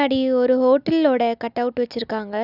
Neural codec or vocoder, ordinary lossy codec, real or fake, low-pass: none; none; real; 5.4 kHz